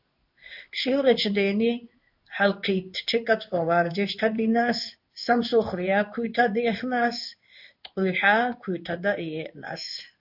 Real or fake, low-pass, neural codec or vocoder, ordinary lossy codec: fake; 5.4 kHz; codec, 16 kHz in and 24 kHz out, 1 kbps, XY-Tokenizer; AAC, 48 kbps